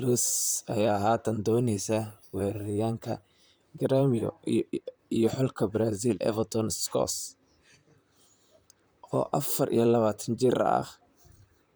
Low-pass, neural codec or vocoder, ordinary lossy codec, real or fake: none; vocoder, 44.1 kHz, 128 mel bands, Pupu-Vocoder; none; fake